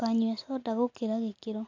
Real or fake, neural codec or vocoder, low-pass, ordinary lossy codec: real; none; 7.2 kHz; AAC, 48 kbps